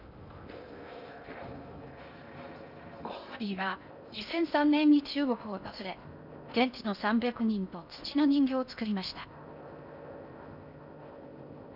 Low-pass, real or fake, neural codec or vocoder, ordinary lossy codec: 5.4 kHz; fake; codec, 16 kHz in and 24 kHz out, 0.6 kbps, FocalCodec, streaming, 2048 codes; none